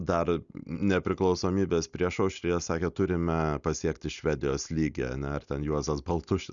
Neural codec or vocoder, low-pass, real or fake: none; 7.2 kHz; real